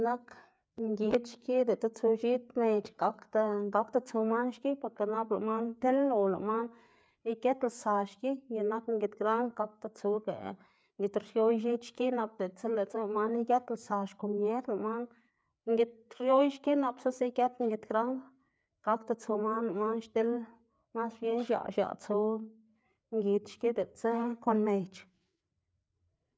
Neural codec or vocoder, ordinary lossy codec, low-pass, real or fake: codec, 16 kHz, 4 kbps, FreqCodec, larger model; none; none; fake